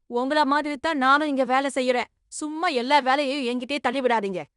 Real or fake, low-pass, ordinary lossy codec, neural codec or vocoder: fake; 10.8 kHz; none; codec, 16 kHz in and 24 kHz out, 0.9 kbps, LongCat-Audio-Codec, fine tuned four codebook decoder